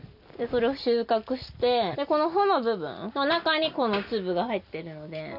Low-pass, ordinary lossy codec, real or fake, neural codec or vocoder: 5.4 kHz; none; real; none